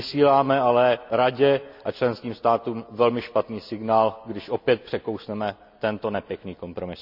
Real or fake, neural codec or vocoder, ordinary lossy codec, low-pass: real; none; none; 5.4 kHz